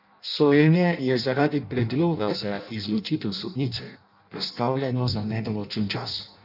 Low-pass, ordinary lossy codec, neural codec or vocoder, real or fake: 5.4 kHz; none; codec, 16 kHz in and 24 kHz out, 0.6 kbps, FireRedTTS-2 codec; fake